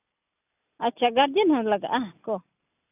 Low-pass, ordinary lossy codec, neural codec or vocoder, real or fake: 3.6 kHz; none; none; real